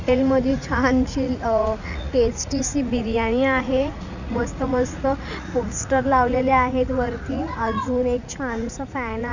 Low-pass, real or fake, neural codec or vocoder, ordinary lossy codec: 7.2 kHz; fake; vocoder, 44.1 kHz, 80 mel bands, Vocos; none